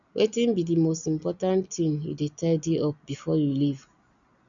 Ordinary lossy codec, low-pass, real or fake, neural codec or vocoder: none; 7.2 kHz; real; none